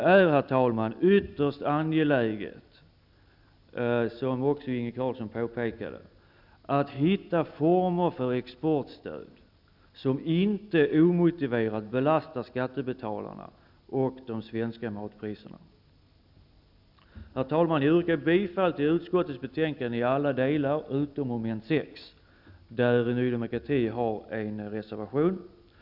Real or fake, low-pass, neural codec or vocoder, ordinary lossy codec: real; 5.4 kHz; none; none